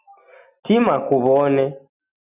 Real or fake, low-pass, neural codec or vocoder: real; 3.6 kHz; none